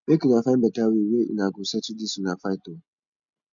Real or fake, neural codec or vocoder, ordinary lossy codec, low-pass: real; none; none; 7.2 kHz